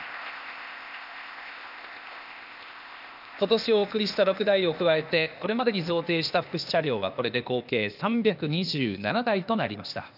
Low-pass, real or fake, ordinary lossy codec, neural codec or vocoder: 5.4 kHz; fake; none; codec, 16 kHz, 0.8 kbps, ZipCodec